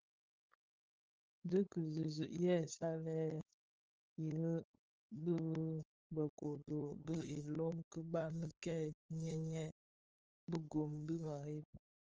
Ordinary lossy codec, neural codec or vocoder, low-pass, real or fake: Opus, 24 kbps; codec, 16 kHz, 8 kbps, FunCodec, trained on LibriTTS, 25 frames a second; 7.2 kHz; fake